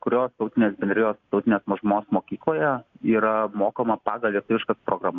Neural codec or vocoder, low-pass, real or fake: none; 7.2 kHz; real